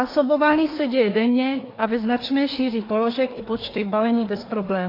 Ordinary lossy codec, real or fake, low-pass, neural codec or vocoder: AAC, 24 kbps; fake; 5.4 kHz; codec, 24 kHz, 1 kbps, SNAC